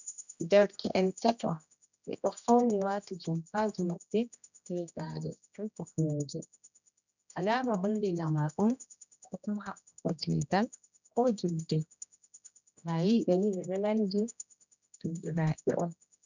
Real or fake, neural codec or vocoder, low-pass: fake; codec, 16 kHz, 1 kbps, X-Codec, HuBERT features, trained on general audio; 7.2 kHz